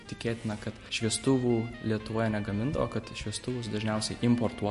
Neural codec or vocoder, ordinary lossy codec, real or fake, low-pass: none; MP3, 48 kbps; real; 14.4 kHz